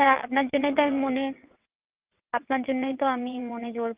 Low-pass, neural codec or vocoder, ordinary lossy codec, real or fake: 3.6 kHz; vocoder, 22.05 kHz, 80 mel bands, WaveNeXt; Opus, 32 kbps; fake